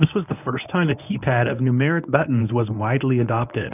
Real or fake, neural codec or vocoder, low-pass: fake; codec, 24 kHz, 0.9 kbps, WavTokenizer, medium speech release version 1; 3.6 kHz